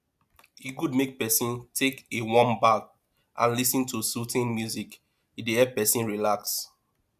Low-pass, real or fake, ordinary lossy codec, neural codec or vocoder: 14.4 kHz; real; none; none